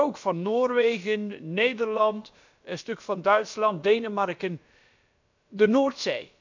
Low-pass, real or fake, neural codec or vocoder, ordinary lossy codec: 7.2 kHz; fake; codec, 16 kHz, about 1 kbps, DyCAST, with the encoder's durations; MP3, 64 kbps